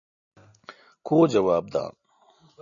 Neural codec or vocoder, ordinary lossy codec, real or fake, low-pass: none; MP3, 96 kbps; real; 7.2 kHz